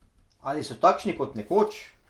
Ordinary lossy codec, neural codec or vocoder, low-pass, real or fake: Opus, 24 kbps; none; 19.8 kHz; real